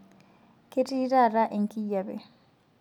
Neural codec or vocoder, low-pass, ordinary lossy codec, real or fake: none; 19.8 kHz; none; real